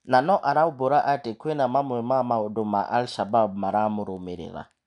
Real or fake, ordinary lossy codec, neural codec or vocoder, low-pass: real; none; none; 10.8 kHz